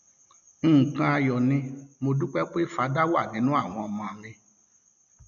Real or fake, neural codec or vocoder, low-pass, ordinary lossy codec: real; none; 7.2 kHz; none